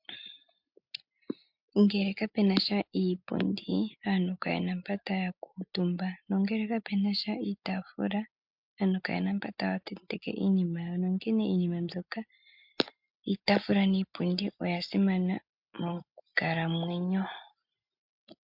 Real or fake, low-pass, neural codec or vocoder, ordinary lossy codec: real; 5.4 kHz; none; MP3, 48 kbps